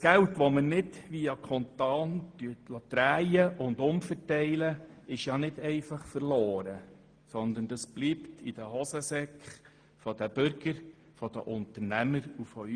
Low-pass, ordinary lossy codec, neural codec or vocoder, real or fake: 9.9 kHz; Opus, 24 kbps; none; real